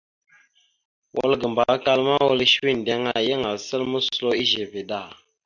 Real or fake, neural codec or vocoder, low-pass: real; none; 7.2 kHz